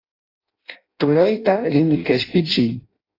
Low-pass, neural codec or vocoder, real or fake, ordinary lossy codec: 5.4 kHz; codec, 16 kHz in and 24 kHz out, 0.6 kbps, FireRedTTS-2 codec; fake; AAC, 24 kbps